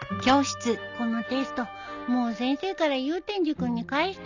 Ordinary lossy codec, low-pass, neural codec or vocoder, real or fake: none; 7.2 kHz; none; real